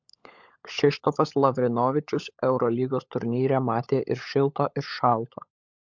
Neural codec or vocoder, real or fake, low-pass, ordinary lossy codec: codec, 16 kHz, 16 kbps, FunCodec, trained on LibriTTS, 50 frames a second; fake; 7.2 kHz; MP3, 64 kbps